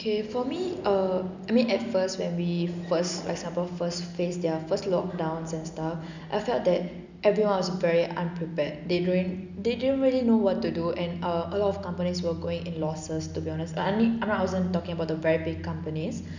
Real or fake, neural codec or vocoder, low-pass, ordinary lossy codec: real; none; 7.2 kHz; none